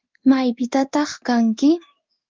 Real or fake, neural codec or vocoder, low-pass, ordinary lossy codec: fake; codec, 16 kHz in and 24 kHz out, 1 kbps, XY-Tokenizer; 7.2 kHz; Opus, 32 kbps